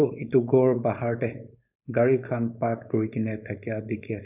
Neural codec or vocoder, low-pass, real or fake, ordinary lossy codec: codec, 16 kHz, 4.8 kbps, FACodec; 3.6 kHz; fake; none